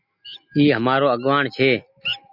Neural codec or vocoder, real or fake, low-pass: none; real; 5.4 kHz